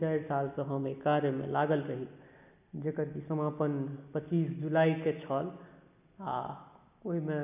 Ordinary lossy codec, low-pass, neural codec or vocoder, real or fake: none; 3.6 kHz; none; real